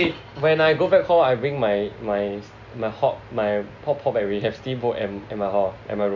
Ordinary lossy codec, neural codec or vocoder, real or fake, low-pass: none; none; real; 7.2 kHz